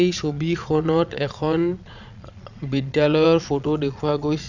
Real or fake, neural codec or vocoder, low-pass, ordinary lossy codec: fake; vocoder, 22.05 kHz, 80 mel bands, Vocos; 7.2 kHz; none